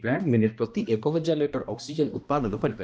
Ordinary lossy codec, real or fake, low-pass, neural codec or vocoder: none; fake; none; codec, 16 kHz, 1 kbps, X-Codec, HuBERT features, trained on balanced general audio